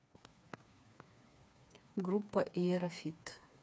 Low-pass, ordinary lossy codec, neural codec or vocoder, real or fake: none; none; codec, 16 kHz, 4 kbps, FreqCodec, smaller model; fake